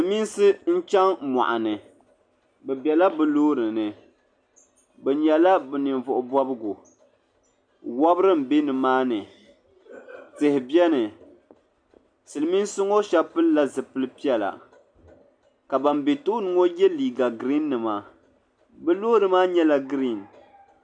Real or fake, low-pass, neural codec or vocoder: real; 9.9 kHz; none